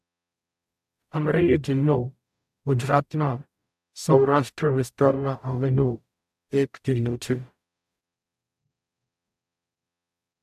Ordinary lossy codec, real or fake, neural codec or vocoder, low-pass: AAC, 96 kbps; fake; codec, 44.1 kHz, 0.9 kbps, DAC; 14.4 kHz